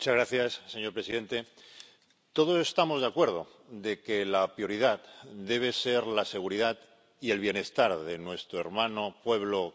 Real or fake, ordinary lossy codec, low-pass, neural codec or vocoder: real; none; none; none